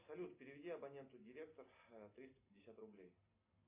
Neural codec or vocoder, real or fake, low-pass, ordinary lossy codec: none; real; 3.6 kHz; AAC, 24 kbps